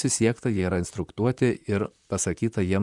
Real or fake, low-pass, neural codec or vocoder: fake; 10.8 kHz; vocoder, 44.1 kHz, 128 mel bands, Pupu-Vocoder